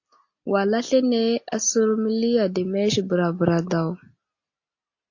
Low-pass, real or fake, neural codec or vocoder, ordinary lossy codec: 7.2 kHz; real; none; AAC, 48 kbps